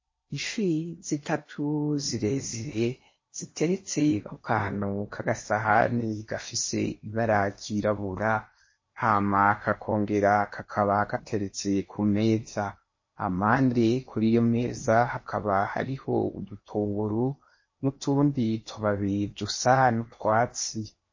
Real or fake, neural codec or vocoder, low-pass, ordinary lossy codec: fake; codec, 16 kHz in and 24 kHz out, 0.8 kbps, FocalCodec, streaming, 65536 codes; 7.2 kHz; MP3, 32 kbps